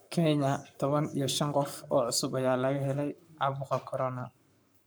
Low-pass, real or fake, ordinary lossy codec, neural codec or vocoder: none; fake; none; codec, 44.1 kHz, 7.8 kbps, Pupu-Codec